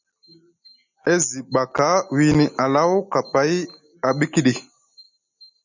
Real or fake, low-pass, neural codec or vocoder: real; 7.2 kHz; none